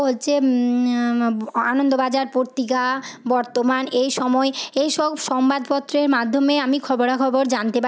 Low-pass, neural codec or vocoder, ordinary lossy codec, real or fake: none; none; none; real